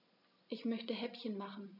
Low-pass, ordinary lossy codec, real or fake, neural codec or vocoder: 5.4 kHz; none; real; none